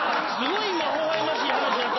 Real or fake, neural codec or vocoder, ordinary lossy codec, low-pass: real; none; MP3, 24 kbps; 7.2 kHz